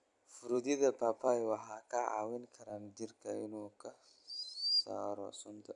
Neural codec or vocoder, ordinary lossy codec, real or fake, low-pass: vocoder, 44.1 kHz, 128 mel bands every 256 samples, BigVGAN v2; none; fake; 10.8 kHz